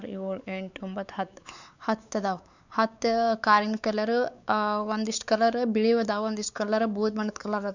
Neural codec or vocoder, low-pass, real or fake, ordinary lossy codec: codec, 16 kHz, 4 kbps, X-Codec, WavLM features, trained on Multilingual LibriSpeech; 7.2 kHz; fake; none